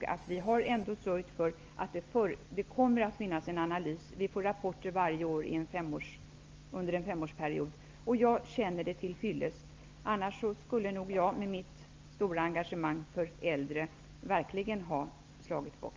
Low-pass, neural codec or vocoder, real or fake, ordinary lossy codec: 7.2 kHz; none; real; Opus, 24 kbps